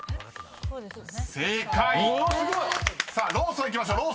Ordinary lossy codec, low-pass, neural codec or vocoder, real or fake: none; none; none; real